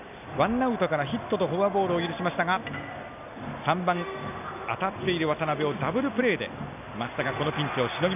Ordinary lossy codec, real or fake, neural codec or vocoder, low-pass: none; real; none; 3.6 kHz